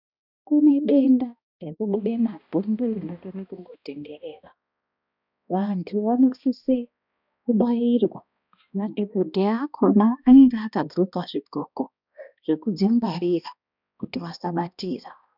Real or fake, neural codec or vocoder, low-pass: fake; codec, 16 kHz, 1 kbps, X-Codec, HuBERT features, trained on balanced general audio; 5.4 kHz